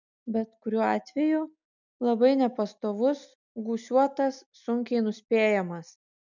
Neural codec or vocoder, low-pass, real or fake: none; 7.2 kHz; real